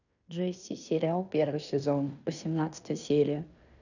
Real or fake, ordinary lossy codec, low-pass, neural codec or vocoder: fake; none; 7.2 kHz; codec, 16 kHz in and 24 kHz out, 0.9 kbps, LongCat-Audio-Codec, fine tuned four codebook decoder